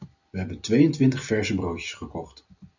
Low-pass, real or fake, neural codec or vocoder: 7.2 kHz; real; none